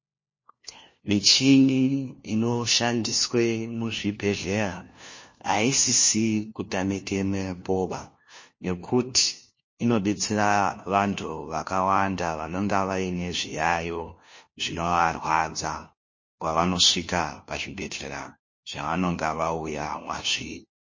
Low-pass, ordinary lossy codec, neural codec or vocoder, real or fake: 7.2 kHz; MP3, 32 kbps; codec, 16 kHz, 1 kbps, FunCodec, trained on LibriTTS, 50 frames a second; fake